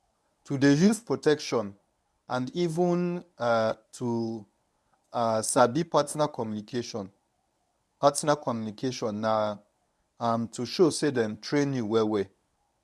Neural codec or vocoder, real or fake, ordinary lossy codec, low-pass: codec, 24 kHz, 0.9 kbps, WavTokenizer, medium speech release version 1; fake; none; none